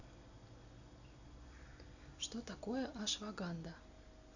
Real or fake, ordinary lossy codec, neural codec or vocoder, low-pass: real; none; none; 7.2 kHz